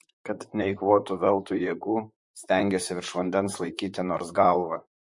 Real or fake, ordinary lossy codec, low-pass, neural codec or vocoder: fake; MP3, 48 kbps; 10.8 kHz; vocoder, 44.1 kHz, 128 mel bands, Pupu-Vocoder